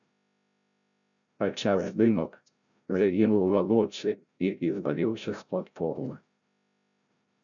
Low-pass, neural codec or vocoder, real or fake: 7.2 kHz; codec, 16 kHz, 0.5 kbps, FreqCodec, larger model; fake